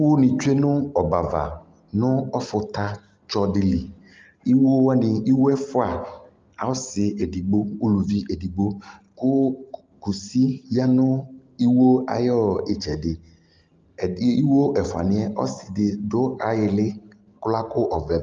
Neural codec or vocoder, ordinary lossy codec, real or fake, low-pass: none; Opus, 24 kbps; real; 7.2 kHz